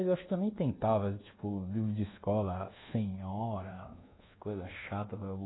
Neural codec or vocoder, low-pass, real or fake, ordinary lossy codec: codec, 24 kHz, 1.2 kbps, DualCodec; 7.2 kHz; fake; AAC, 16 kbps